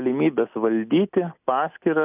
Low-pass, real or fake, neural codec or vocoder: 3.6 kHz; real; none